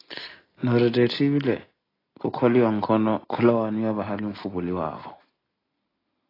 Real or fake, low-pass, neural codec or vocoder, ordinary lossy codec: real; 5.4 kHz; none; AAC, 24 kbps